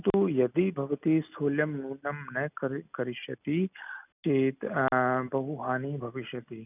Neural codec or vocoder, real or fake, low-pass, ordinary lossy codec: none; real; 3.6 kHz; none